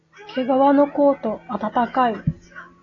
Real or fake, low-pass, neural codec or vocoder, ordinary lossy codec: real; 7.2 kHz; none; AAC, 32 kbps